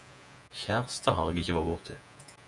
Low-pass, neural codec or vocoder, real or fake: 10.8 kHz; vocoder, 48 kHz, 128 mel bands, Vocos; fake